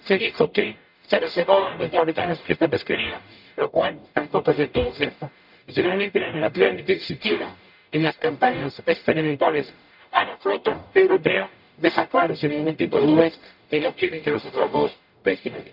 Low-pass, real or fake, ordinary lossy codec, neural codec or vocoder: 5.4 kHz; fake; none; codec, 44.1 kHz, 0.9 kbps, DAC